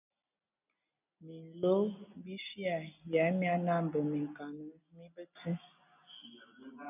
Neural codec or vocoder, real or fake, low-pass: none; real; 3.6 kHz